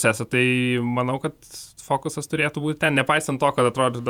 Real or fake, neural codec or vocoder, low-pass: fake; vocoder, 48 kHz, 128 mel bands, Vocos; 19.8 kHz